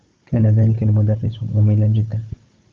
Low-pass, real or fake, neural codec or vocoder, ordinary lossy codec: 7.2 kHz; fake; codec, 16 kHz, 16 kbps, FunCodec, trained on Chinese and English, 50 frames a second; Opus, 32 kbps